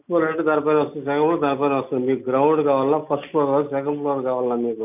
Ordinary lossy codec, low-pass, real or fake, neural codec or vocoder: none; 3.6 kHz; real; none